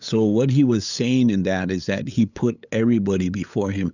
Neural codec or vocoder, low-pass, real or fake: codec, 16 kHz, 8 kbps, FunCodec, trained on LibriTTS, 25 frames a second; 7.2 kHz; fake